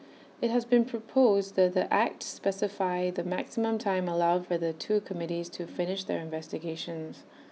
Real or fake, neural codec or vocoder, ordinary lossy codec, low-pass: real; none; none; none